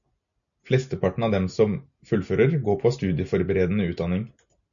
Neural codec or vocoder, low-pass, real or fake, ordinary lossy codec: none; 7.2 kHz; real; MP3, 96 kbps